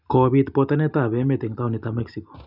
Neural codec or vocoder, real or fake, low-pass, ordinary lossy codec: none; real; 5.4 kHz; none